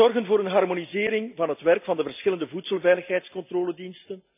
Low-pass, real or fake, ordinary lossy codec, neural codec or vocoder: 3.6 kHz; real; none; none